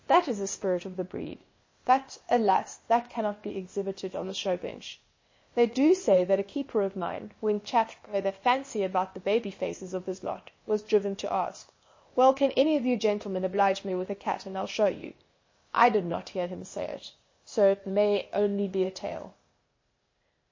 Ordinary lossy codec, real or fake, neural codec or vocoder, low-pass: MP3, 32 kbps; fake; codec, 16 kHz, 0.8 kbps, ZipCodec; 7.2 kHz